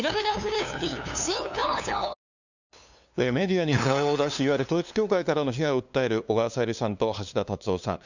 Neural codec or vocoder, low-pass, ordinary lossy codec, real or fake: codec, 16 kHz, 2 kbps, FunCodec, trained on LibriTTS, 25 frames a second; 7.2 kHz; none; fake